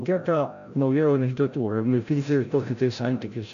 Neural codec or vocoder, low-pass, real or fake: codec, 16 kHz, 0.5 kbps, FreqCodec, larger model; 7.2 kHz; fake